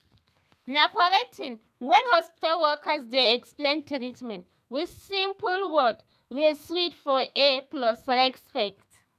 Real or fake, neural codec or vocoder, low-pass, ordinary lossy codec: fake; codec, 32 kHz, 1.9 kbps, SNAC; 14.4 kHz; none